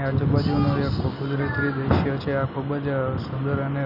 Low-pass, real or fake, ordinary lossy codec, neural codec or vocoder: 5.4 kHz; real; none; none